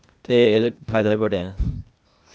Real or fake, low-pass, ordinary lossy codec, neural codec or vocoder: fake; none; none; codec, 16 kHz, 0.8 kbps, ZipCodec